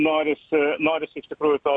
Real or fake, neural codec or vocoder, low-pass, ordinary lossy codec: real; none; 9.9 kHz; MP3, 48 kbps